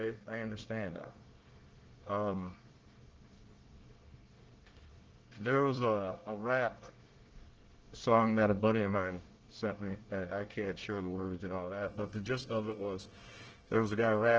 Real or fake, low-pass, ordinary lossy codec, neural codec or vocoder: fake; 7.2 kHz; Opus, 16 kbps; codec, 24 kHz, 1 kbps, SNAC